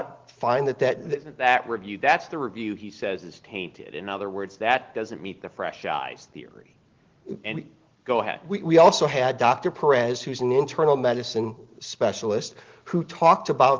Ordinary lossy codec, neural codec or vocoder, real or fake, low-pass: Opus, 16 kbps; none; real; 7.2 kHz